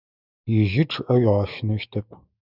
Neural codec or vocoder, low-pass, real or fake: autoencoder, 48 kHz, 128 numbers a frame, DAC-VAE, trained on Japanese speech; 5.4 kHz; fake